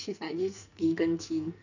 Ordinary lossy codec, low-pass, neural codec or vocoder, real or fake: none; 7.2 kHz; codec, 44.1 kHz, 2.6 kbps, SNAC; fake